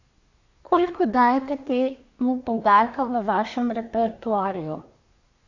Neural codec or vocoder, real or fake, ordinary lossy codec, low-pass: codec, 24 kHz, 1 kbps, SNAC; fake; AAC, 48 kbps; 7.2 kHz